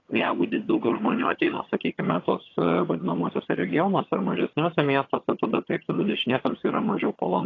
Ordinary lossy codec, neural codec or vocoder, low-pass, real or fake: AAC, 32 kbps; vocoder, 22.05 kHz, 80 mel bands, HiFi-GAN; 7.2 kHz; fake